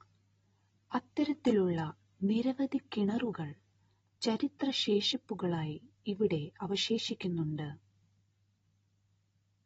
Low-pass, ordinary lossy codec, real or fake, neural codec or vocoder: 19.8 kHz; AAC, 24 kbps; real; none